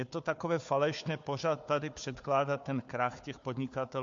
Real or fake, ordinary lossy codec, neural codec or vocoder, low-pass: fake; MP3, 48 kbps; codec, 16 kHz, 4 kbps, FunCodec, trained on Chinese and English, 50 frames a second; 7.2 kHz